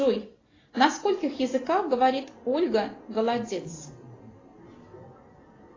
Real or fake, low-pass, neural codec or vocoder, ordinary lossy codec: real; 7.2 kHz; none; AAC, 32 kbps